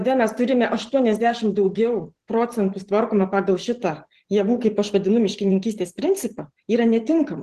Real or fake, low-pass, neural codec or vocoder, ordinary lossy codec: fake; 14.4 kHz; vocoder, 44.1 kHz, 128 mel bands every 512 samples, BigVGAN v2; Opus, 16 kbps